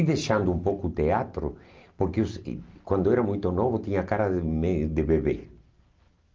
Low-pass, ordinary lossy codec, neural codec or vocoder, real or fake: 7.2 kHz; Opus, 24 kbps; none; real